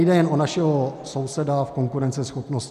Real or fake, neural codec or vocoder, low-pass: real; none; 14.4 kHz